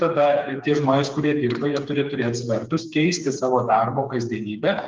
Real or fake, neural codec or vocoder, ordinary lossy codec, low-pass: fake; codec, 16 kHz, 4 kbps, FreqCodec, smaller model; Opus, 24 kbps; 7.2 kHz